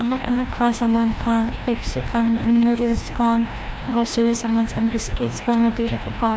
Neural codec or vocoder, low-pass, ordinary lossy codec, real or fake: codec, 16 kHz, 1 kbps, FreqCodec, larger model; none; none; fake